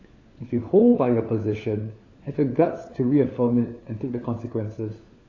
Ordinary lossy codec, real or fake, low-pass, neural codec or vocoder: AAC, 32 kbps; fake; 7.2 kHz; codec, 16 kHz, 4 kbps, FunCodec, trained on LibriTTS, 50 frames a second